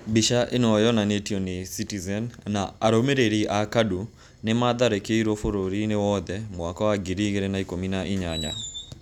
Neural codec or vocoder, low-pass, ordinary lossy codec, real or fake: none; 19.8 kHz; none; real